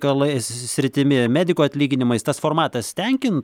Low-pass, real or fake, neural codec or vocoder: 19.8 kHz; real; none